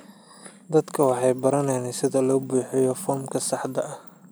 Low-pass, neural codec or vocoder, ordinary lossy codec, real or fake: none; none; none; real